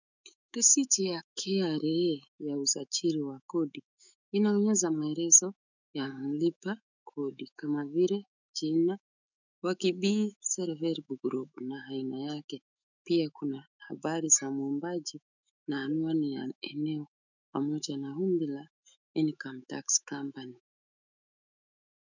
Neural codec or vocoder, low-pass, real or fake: autoencoder, 48 kHz, 128 numbers a frame, DAC-VAE, trained on Japanese speech; 7.2 kHz; fake